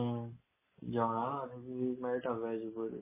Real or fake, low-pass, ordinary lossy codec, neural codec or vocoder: real; 3.6 kHz; MP3, 16 kbps; none